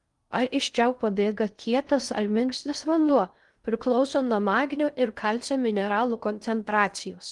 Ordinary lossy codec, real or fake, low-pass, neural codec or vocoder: Opus, 24 kbps; fake; 10.8 kHz; codec, 16 kHz in and 24 kHz out, 0.6 kbps, FocalCodec, streaming, 2048 codes